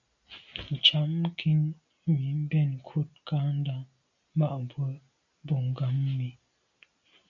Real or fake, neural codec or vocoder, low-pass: real; none; 7.2 kHz